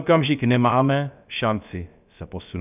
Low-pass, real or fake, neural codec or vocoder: 3.6 kHz; fake; codec, 16 kHz, about 1 kbps, DyCAST, with the encoder's durations